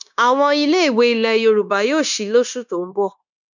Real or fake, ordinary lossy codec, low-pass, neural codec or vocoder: fake; none; 7.2 kHz; codec, 16 kHz, 0.9 kbps, LongCat-Audio-Codec